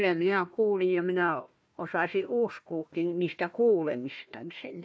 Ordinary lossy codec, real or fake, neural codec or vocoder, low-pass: none; fake; codec, 16 kHz, 1 kbps, FunCodec, trained on Chinese and English, 50 frames a second; none